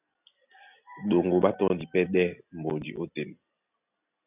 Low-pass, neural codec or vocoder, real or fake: 3.6 kHz; none; real